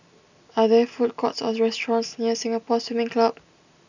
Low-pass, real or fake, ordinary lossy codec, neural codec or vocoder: 7.2 kHz; real; none; none